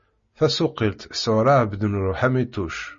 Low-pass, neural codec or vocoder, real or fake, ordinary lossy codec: 7.2 kHz; none; real; MP3, 32 kbps